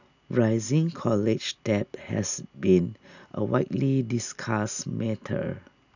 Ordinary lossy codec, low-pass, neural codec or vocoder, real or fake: none; 7.2 kHz; none; real